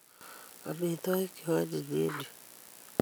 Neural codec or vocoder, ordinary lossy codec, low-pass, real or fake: none; none; none; real